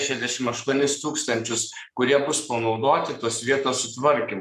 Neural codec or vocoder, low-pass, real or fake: codec, 44.1 kHz, 7.8 kbps, Pupu-Codec; 14.4 kHz; fake